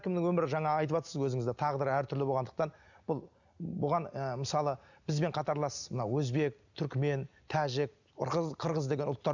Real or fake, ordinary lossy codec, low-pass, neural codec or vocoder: real; none; 7.2 kHz; none